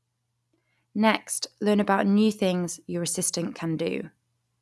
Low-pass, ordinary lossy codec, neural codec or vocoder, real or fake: none; none; none; real